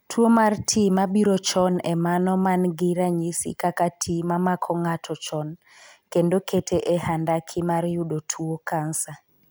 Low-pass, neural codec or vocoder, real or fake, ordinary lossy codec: none; none; real; none